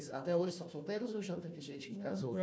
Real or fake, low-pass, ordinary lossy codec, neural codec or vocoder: fake; none; none; codec, 16 kHz, 1 kbps, FunCodec, trained on Chinese and English, 50 frames a second